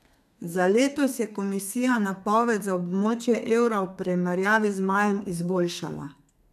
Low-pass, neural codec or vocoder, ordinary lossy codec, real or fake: 14.4 kHz; codec, 32 kHz, 1.9 kbps, SNAC; none; fake